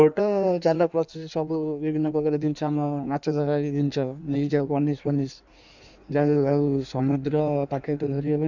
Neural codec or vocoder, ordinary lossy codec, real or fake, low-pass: codec, 16 kHz in and 24 kHz out, 1.1 kbps, FireRedTTS-2 codec; none; fake; 7.2 kHz